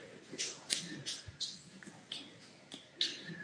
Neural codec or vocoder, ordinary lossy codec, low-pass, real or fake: codec, 24 kHz, 0.9 kbps, WavTokenizer, medium speech release version 1; MP3, 48 kbps; 9.9 kHz; fake